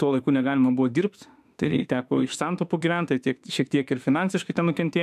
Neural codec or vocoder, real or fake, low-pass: autoencoder, 48 kHz, 32 numbers a frame, DAC-VAE, trained on Japanese speech; fake; 14.4 kHz